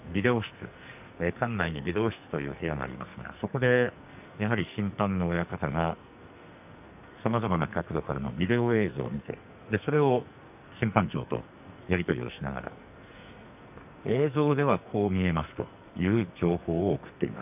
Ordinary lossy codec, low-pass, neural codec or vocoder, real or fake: none; 3.6 kHz; codec, 44.1 kHz, 2.6 kbps, SNAC; fake